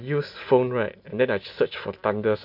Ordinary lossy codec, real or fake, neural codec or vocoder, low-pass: MP3, 48 kbps; real; none; 5.4 kHz